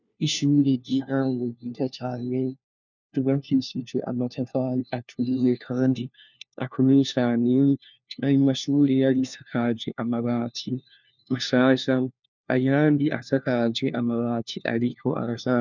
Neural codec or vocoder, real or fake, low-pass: codec, 16 kHz, 1 kbps, FunCodec, trained on LibriTTS, 50 frames a second; fake; 7.2 kHz